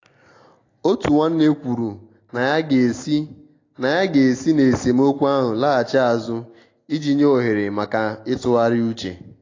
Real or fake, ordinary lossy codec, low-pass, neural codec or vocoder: real; AAC, 32 kbps; 7.2 kHz; none